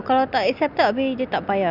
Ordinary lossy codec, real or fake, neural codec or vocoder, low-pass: none; real; none; 5.4 kHz